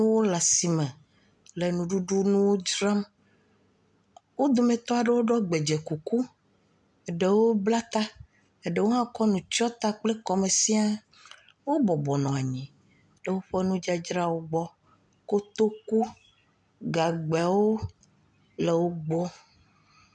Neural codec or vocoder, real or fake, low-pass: none; real; 10.8 kHz